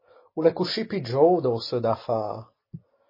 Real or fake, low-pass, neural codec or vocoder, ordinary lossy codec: real; 5.4 kHz; none; MP3, 24 kbps